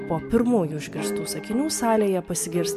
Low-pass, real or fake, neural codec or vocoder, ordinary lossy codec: 14.4 kHz; real; none; MP3, 96 kbps